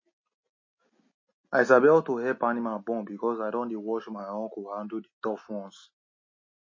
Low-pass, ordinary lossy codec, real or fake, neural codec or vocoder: 7.2 kHz; MP3, 32 kbps; real; none